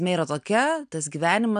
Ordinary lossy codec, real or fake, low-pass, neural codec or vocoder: AAC, 96 kbps; real; 9.9 kHz; none